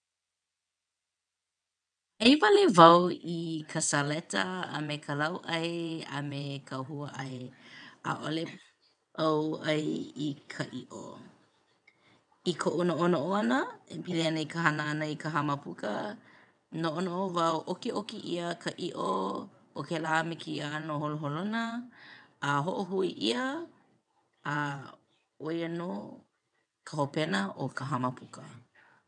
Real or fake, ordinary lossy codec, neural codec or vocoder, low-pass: fake; none; vocoder, 22.05 kHz, 80 mel bands, WaveNeXt; 9.9 kHz